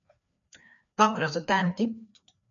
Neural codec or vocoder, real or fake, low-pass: codec, 16 kHz, 2 kbps, FreqCodec, larger model; fake; 7.2 kHz